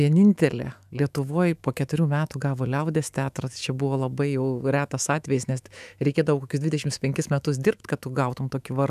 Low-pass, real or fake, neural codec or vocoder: 14.4 kHz; fake; autoencoder, 48 kHz, 128 numbers a frame, DAC-VAE, trained on Japanese speech